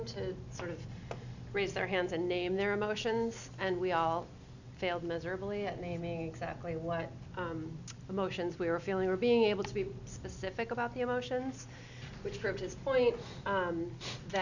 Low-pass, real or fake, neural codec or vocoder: 7.2 kHz; real; none